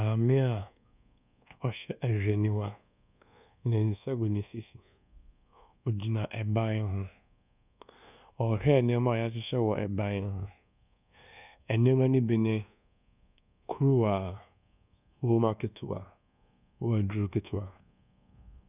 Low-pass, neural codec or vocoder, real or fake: 3.6 kHz; codec, 24 kHz, 1.2 kbps, DualCodec; fake